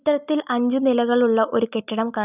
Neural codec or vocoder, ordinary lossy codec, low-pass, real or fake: none; none; 3.6 kHz; real